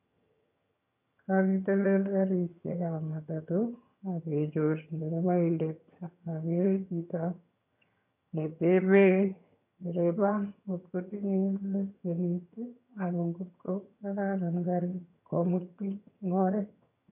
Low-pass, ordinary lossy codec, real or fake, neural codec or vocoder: 3.6 kHz; none; fake; vocoder, 22.05 kHz, 80 mel bands, HiFi-GAN